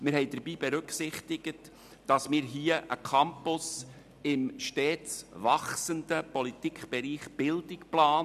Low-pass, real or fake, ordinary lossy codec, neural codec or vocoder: 14.4 kHz; real; none; none